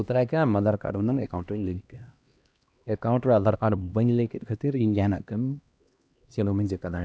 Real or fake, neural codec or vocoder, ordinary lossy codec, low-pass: fake; codec, 16 kHz, 1 kbps, X-Codec, HuBERT features, trained on LibriSpeech; none; none